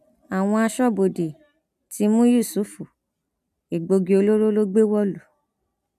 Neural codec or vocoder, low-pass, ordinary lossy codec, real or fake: none; 14.4 kHz; none; real